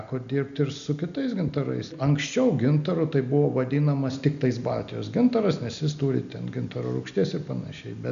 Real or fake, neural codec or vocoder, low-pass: real; none; 7.2 kHz